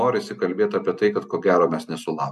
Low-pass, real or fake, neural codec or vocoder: 14.4 kHz; real; none